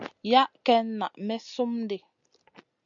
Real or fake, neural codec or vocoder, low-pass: real; none; 7.2 kHz